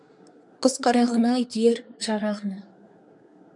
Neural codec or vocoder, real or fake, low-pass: codec, 24 kHz, 1 kbps, SNAC; fake; 10.8 kHz